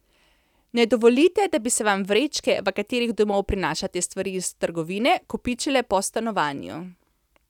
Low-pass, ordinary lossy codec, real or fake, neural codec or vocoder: 19.8 kHz; none; real; none